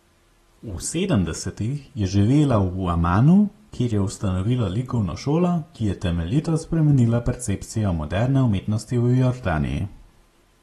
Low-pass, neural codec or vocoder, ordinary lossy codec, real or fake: 19.8 kHz; none; AAC, 32 kbps; real